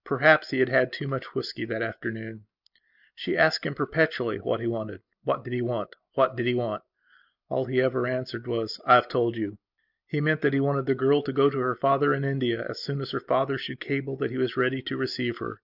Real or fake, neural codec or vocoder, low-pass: real; none; 5.4 kHz